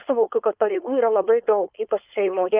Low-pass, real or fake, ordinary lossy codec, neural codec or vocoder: 3.6 kHz; fake; Opus, 32 kbps; codec, 16 kHz, 4.8 kbps, FACodec